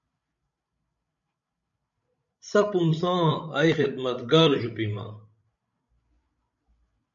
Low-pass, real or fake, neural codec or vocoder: 7.2 kHz; fake; codec, 16 kHz, 16 kbps, FreqCodec, larger model